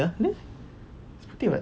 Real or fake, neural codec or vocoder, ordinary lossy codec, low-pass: real; none; none; none